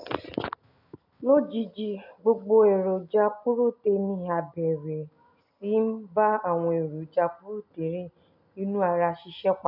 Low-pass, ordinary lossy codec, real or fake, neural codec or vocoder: 5.4 kHz; none; real; none